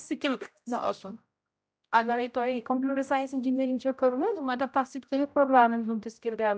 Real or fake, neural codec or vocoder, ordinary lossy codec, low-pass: fake; codec, 16 kHz, 0.5 kbps, X-Codec, HuBERT features, trained on general audio; none; none